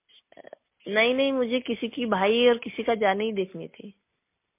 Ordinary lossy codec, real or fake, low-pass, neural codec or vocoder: MP3, 24 kbps; real; 3.6 kHz; none